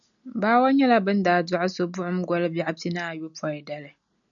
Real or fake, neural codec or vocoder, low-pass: real; none; 7.2 kHz